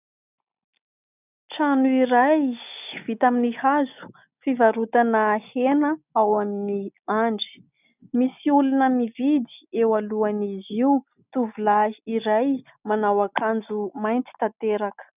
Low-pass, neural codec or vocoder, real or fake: 3.6 kHz; none; real